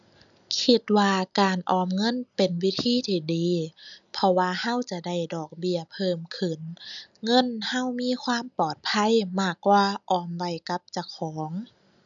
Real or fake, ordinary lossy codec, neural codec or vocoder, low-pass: real; none; none; 7.2 kHz